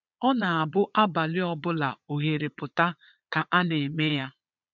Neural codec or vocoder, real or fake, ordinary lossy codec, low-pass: vocoder, 22.05 kHz, 80 mel bands, Vocos; fake; none; 7.2 kHz